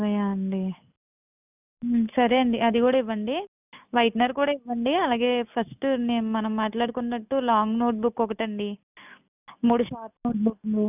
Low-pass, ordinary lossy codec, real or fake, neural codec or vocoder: 3.6 kHz; none; real; none